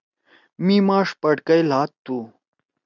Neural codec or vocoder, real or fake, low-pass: none; real; 7.2 kHz